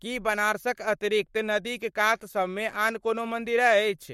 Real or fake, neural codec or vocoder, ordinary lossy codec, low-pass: fake; codec, 44.1 kHz, 7.8 kbps, Pupu-Codec; MP3, 64 kbps; 19.8 kHz